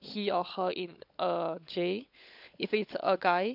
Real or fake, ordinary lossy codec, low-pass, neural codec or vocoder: fake; none; 5.4 kHz; codec, 16 kHz, 16 kbps, FunCodec, trained on LibriTTS, 50 frames a second